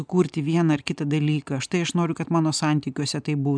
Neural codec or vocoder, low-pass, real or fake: none; 9.9 kHz; real